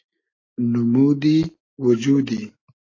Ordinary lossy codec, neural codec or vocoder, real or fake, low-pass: AAC, 32 kbps; none; real; 7.2 kHz